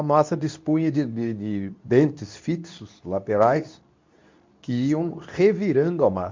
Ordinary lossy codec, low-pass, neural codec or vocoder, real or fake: MP3, 64 kbps; 7.2 kHz; codec, 24 kHz, 0.9 kbps, WavTokenizer, medium speech release version 2; fake